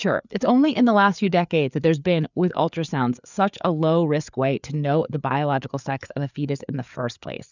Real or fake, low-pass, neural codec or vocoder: fake; 7.2 kHz; codec, 16 kHz, 4 kbps, FreqCodec, larger model